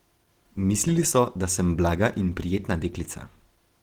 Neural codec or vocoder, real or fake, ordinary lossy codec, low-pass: none; real; Opus, 16 kbps; 19.8 kHz